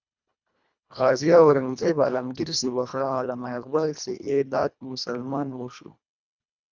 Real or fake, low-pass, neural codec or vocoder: fake; 7.2 kHz; codec, 24 kHz, 1.5 kbps, HILCodec